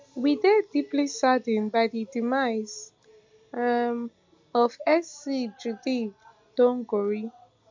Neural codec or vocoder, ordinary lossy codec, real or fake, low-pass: none; MP3, 64 kbps; real; 7.2 kHz